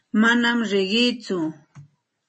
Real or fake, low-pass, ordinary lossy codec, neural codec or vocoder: real; 10.8 kHz; MP3, 32 kbps; none